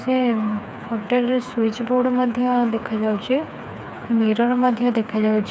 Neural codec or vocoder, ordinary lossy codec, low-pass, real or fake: codec, 16 kHz, 4 kbps, FreqCodec, smaller model; none; none; fake